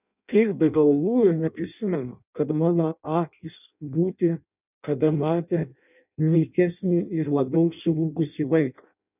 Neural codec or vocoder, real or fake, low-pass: codec, 16 kHz in and 24 kHz out, 0.6 kbps, FireRedTTS-2 codec; fake; 3.6 kHz